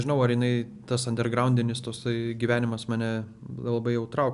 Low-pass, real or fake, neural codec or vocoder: 10.8 kHz; real; none